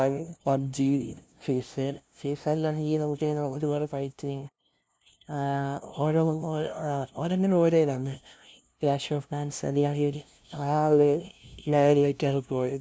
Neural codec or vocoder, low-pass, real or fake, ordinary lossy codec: codec, 16 kHz, 0.5 kbps, FunCodec, trained on LibriTTS, 25 frames a second; none; fake; none